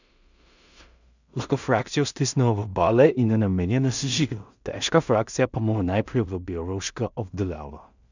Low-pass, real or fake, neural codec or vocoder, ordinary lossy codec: 7.2 kHz; fake; codec, 16 kHz in and 24 kHz out, 0.4 kbps, LongCat-Audio-Codec, two codebook decoder; none